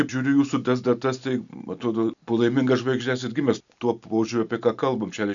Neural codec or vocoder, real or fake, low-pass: none; real; 7.2 kHz